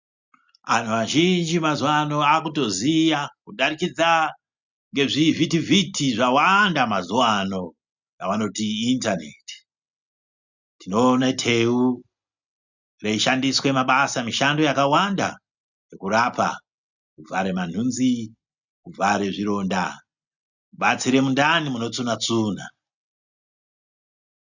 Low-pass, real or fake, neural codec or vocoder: 7.2 kHz; real; none